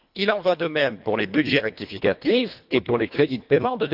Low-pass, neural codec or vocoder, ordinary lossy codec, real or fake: 5.4 kHz; codec, 24 kHz, 1.5 kbps, HILCodec; AAC, 48 kbps; fake